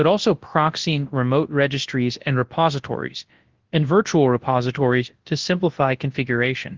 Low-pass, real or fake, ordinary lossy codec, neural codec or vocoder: 7.2 kHz; fake; Opus, 16 kbps; codec, 24 kHz, 0.9 kbps, WavTokenizer, large speech release